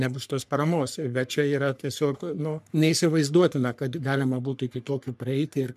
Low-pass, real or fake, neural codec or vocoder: 14.4 kHz; fake; codec, 44.1 kHz, 3.4 kbps, Pupu-Codec